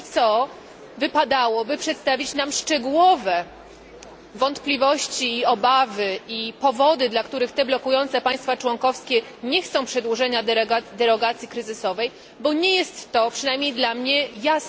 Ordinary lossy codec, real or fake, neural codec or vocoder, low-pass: none; real; none; none